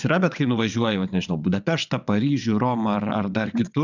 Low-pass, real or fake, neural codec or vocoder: 7.2 kHz; fake; vocoder, 22.05 kHz, 80 mel bands, WaveNeXt